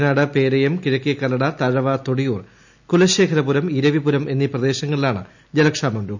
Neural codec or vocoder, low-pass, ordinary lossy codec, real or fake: none; 7.2 kHz; none; real